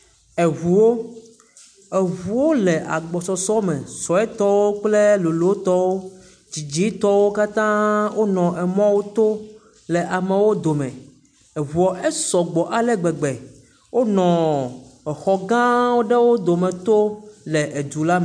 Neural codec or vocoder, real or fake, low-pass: none; real; 9.9 kHz